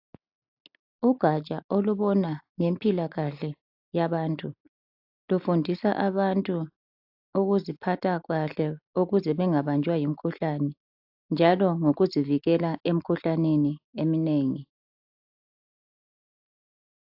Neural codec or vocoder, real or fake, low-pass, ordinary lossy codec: none; real; 5.4 kHz; AAC, 48 kbps